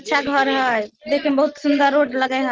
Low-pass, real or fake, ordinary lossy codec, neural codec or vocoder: 7.2 kHz; real; Opus, 16 kbps; none